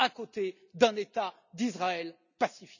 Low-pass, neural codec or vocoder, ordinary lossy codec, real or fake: 7.2 kHz; none; none; real